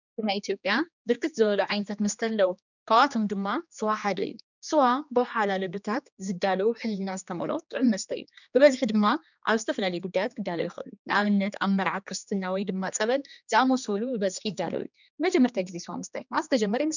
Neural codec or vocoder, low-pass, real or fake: codec, 16 kHz, 2 kbps, X-Codec, HuBERT features, trained on general audio; 7.2 kHz; fake